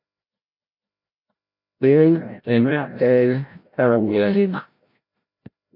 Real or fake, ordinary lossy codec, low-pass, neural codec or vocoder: fake; MP3, 32 kbps; 5.4 kHz; codec, 16 kHz, 0.5 kbps, FreqCodec, larger model